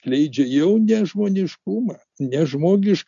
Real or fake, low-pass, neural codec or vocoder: real; 7.2 kHz; none